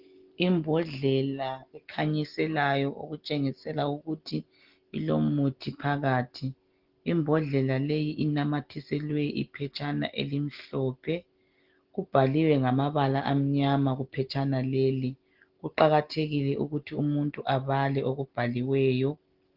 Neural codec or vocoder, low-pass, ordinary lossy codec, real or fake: none; 5.4 kHz; Opus, 16 kbps; real